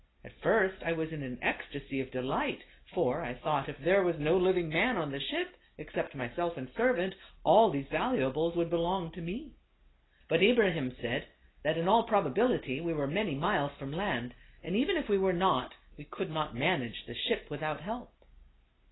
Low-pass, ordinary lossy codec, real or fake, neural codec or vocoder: 7.2 kHz; AAC, 16 kbps; real; none